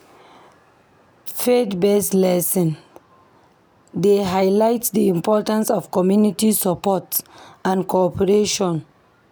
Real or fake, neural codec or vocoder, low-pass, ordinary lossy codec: real; none; none; none